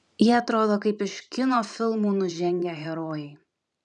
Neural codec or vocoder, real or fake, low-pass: vocoder, 44.1 kHz, 128 mel bands every 512 samples, BigVGAN v2; fake; 10.8 kHz